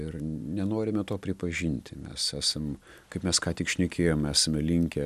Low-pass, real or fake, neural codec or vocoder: 14.4 kHz; real; none